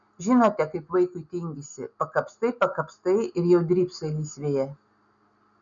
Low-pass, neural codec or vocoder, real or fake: 7.2 kHz; none; real